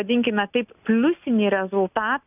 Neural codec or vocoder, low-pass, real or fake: none; 3.6 kHz; real